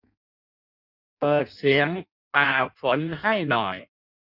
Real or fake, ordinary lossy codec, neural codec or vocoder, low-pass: fake; none; codec, 16 kHz in and 24 kHz out, 0.6 kbps, FireRedTTS-2 codec; 5.4 kHz